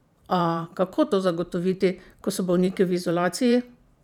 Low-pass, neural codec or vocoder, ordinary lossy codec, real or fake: 19.8 kHz; vocoder, 44.1 kHz, 128 mel bands every 512 samples, BigVGAN v2; none; fake